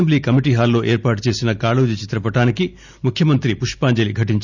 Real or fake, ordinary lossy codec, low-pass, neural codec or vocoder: real; none; 7.2 kHz; none